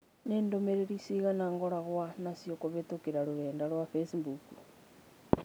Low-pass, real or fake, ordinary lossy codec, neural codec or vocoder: none; real; none; none